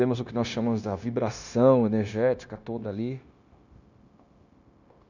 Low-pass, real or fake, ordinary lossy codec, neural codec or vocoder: 7.2 kHz; fake; none; codec, 16 kHz, 0.9 kbps, LongCat-Audio-Codec